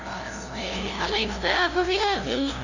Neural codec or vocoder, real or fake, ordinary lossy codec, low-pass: codec, 16 kHz, 0.5 kbps, FunCodec, trained on LibriTTS, 25 frames a second; fake; none; 7.2 kHz